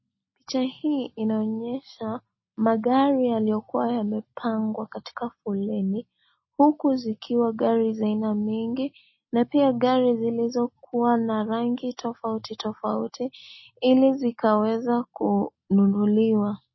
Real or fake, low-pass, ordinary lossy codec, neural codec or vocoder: real; 7.2 kHz; MP3, 24 kbps; none